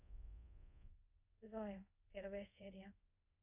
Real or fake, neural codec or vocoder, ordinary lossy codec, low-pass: fake; codec, 24 kHz, 0.5 kbps, DualCodec; none; 3.6 kHz